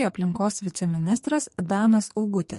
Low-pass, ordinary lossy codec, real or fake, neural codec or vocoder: 14.4 kHz; MP3, 48 kbps; fake; codec, 44.1 kHz, 2.6 kbps, SNAC